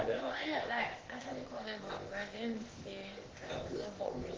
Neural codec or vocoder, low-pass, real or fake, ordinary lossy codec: codec, 16 kHz, 0.8 kbps, ZipCodec; 7.2 kHz; fake; Opus, 16 kbps